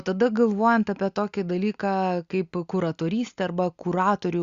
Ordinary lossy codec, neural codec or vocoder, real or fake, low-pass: Opus, 64 kbps; none; real; 7.2 kHz